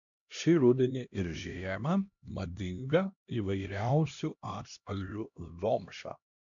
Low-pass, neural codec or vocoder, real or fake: 7.2 kHz; codec, 16 kHz, 1 kbps, X-Codec, HuBERT features, trained on LibriSpeech; fake